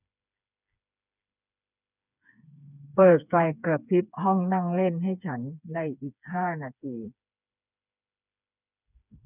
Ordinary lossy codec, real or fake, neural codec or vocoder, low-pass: none; fake; codec, 16 kHz, 4 kbps, FreqCodec, smaller model; 3.6 kHz